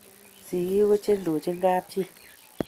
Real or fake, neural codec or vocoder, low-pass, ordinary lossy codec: real; none; 14.4 kHz; Opus, 24 kbps